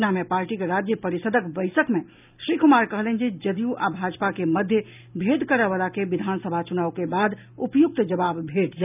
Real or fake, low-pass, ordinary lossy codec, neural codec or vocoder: real; 3.6 kHz; none; none